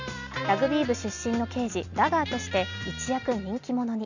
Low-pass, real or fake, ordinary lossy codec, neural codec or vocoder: 7.2 kHz; real; none; none